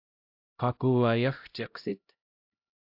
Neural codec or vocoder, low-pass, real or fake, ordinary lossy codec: codec, 16 kHz, 0.5 kbps, X-Codec, HuBERT features, trained on LibriSpeech; 5.4 kHz; fake; AAC, 48 kbps